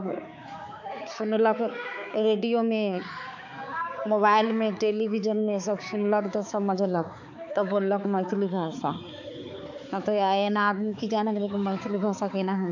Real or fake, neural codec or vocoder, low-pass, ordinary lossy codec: fake; codec, 16 kHz, 4 kbps, X-Codec, HuBERT features, trained on balanced general audio; 7.2 kHz; none